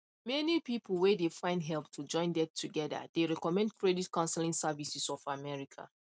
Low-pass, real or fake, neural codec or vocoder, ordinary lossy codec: none; real; none; none